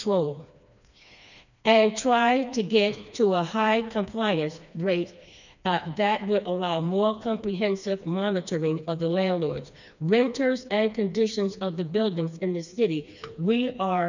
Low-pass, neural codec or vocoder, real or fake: 7.2 kHz; codec, 16 kHz, 2 kbps, FreqCodec, smaller model; fake